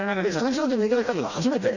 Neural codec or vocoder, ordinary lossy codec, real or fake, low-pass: codec, 16 kHz, 1 kbps, FreqCodec, smaller model; none; fake; 7.2 kHz